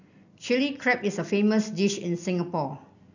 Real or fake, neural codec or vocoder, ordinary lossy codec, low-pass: real; none; none; 7.2 kHz